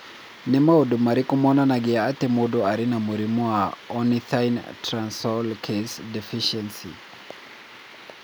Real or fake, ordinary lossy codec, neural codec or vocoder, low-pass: real; none; none; none